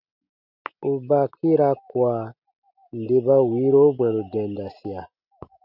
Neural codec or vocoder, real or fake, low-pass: none; real; 5.4 kHz